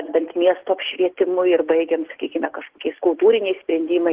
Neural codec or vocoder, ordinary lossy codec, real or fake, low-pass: none; Opus, 16 kbps; real; 3.6 kHz